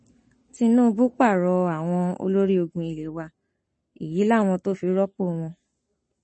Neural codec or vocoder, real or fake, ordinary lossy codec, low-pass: codec, 44.1 kHz, 7.8 kbps, Pupu-Codec; fake; MP3, 32 kbps; 9.9 kHz